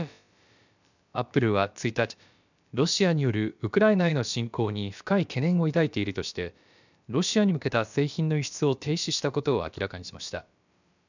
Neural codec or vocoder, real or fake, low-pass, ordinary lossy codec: codec, 16 kHz, about 1 kbps, DyCAST, with the encoder's durations; fake; 7.2 kHz; none